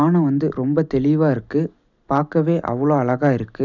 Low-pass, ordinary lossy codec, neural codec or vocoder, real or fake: 7.2 kHz; none; none; real